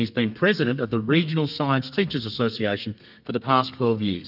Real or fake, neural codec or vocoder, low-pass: fake; codec, 32 kHz, 1.9 kbps, SNAC; 5.4 kHz